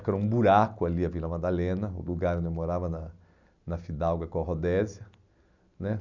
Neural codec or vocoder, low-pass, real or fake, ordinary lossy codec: none; 7.2 kHz; real; none